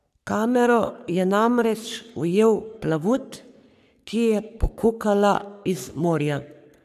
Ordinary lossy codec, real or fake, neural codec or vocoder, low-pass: none; fake; codec, 44.1 kHz, 3.4 kbps, Pupu-Codec; 14.4 kHz